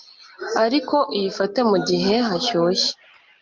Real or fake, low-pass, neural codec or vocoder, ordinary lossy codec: real; 7.2 kHz; none; Opus, 24 kbps